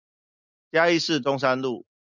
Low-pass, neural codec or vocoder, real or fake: 7.2 kHz; none; real